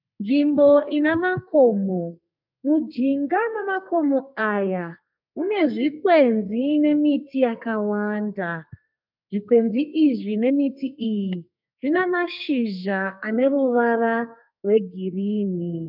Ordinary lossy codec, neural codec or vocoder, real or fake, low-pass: MP3, 48 kbps; codec, 32 kHz, 1.9 kbps, SNAC; fake; 5.4 kHz